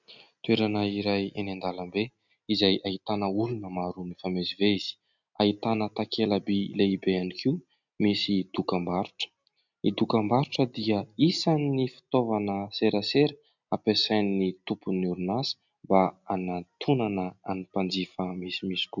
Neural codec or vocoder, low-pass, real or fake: none; 7.2 kHz; real